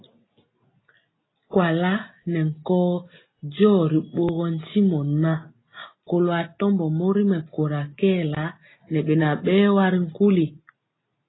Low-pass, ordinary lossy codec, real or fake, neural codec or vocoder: 7.2 kHz; AAC, 16 kbps; real; none